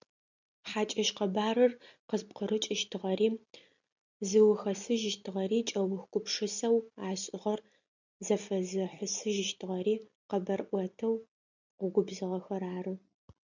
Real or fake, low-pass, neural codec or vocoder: real; 7.2 kHz; none